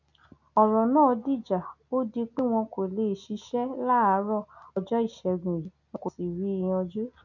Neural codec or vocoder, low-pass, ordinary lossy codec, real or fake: none; 7.2 kHz; none; real